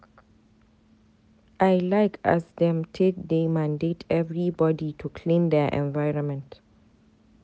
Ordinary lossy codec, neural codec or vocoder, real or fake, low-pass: none; none; real; none